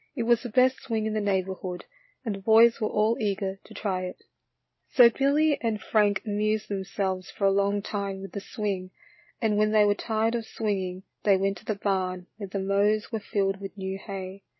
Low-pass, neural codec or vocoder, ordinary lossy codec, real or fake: 7.2 kHz; none; MP3, 24 kbps; real